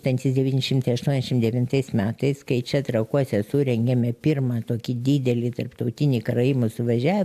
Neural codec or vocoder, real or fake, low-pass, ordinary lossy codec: none; real; 14.4 kHz; AAC, 96 kbps